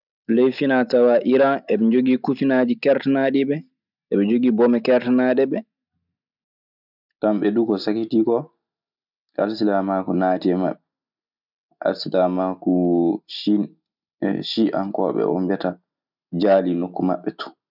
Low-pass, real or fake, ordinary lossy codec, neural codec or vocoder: 5.4 kHz; real; none; none